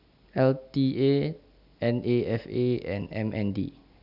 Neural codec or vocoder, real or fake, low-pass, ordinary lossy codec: none; real; 5.4 kHz; none